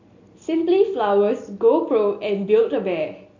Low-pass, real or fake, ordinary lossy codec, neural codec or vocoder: 7.2 kHz; real; AAC, 48 kbps; none